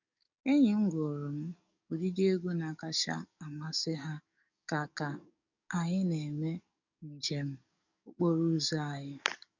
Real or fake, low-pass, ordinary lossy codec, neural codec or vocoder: fake; 7.2 kHz; none; codec, 44.1 kHz, 7.8 kbps, DAC